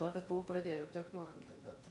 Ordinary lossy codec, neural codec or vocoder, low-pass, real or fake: MP3, 64 kbps; codec, 16 kHz in and 24 kHz out, 0.8 kbps, FocalCodec, streaming, 65536 codes; 10.8 kHz; fake